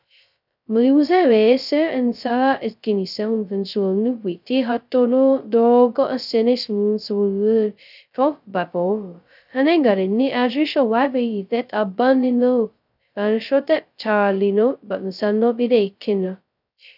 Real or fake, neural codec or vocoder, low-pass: fake; codec, 16 kHz, 0.2 kbps, FocalCodec; 5.4 kHz